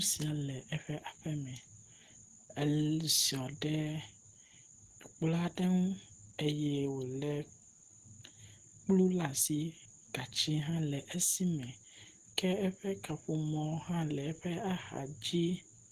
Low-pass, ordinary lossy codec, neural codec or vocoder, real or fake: 14.4 kHz; Opus, 16 kbps; none; real